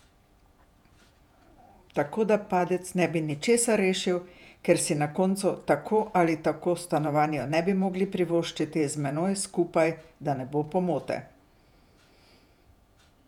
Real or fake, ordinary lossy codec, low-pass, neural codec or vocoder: fake; none; 19.8 kHz; vocoder, 48 kHz, 128 mel bands, Vocos